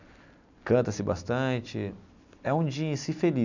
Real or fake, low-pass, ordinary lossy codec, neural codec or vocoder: real; 7.2 kHz; none; none